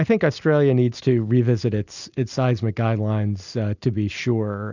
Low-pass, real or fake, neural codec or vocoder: 7.2 kHz; real; none